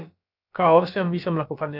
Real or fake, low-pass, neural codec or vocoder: fake; 5.4 kHz; codec, 16 kHz, about 1 kbps, DyCAST, with the encoder's durations